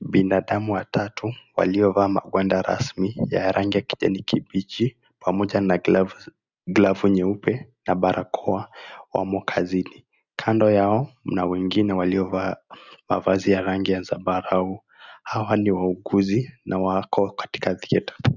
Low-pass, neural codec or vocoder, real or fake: 7.2 kHz; none; real